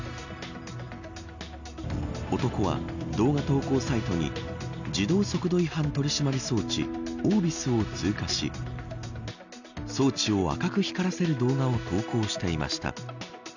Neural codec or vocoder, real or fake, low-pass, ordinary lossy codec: none; real; 7.2 kHz; none